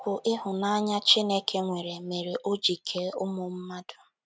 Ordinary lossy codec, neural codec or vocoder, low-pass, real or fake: none; none; none; real